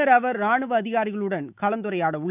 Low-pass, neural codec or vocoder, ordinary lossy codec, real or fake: 3.6 kHz; autoencoder, 48 kHz, 128 numbers a frame, DAC-VAE, trained on Japanese speech; none; fake